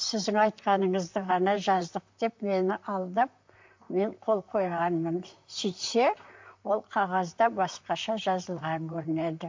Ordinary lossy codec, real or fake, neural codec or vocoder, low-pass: MP3, 48 kbps; fake; vocoder, 44.1 kHz, 128 mel bands, Pupu-Vocoder; 7.2 kHz